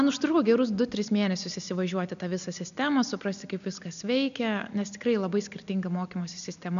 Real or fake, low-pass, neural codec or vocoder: real; 7.2 kHz; none